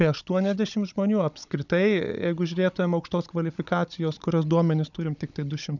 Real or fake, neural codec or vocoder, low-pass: fake; codec, 16 kHz, 16 kbps, FunCodec, trained on Chinese and English, 50 frames a second; 7.2 kHz